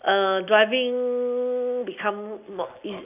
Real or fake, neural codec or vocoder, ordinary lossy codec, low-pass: real; none; none; 3.6 kHz